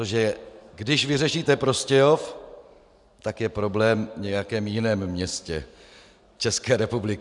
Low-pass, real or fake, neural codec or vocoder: 10.8 kHz; fake; vocoder, 44.1 kHz, 128 mel bands every 512 samples, BigVGAN v2